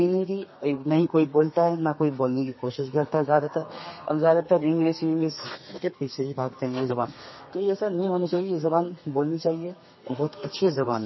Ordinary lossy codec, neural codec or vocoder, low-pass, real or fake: MP3, 24 kbps; codec, 32 kHz, 1.9 kbps, SNAC; 7.2 kHz; fake